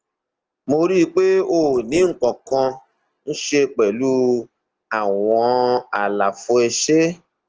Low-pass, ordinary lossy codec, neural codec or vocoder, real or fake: 7.2 kHz; Opus, 16 kbps; none; real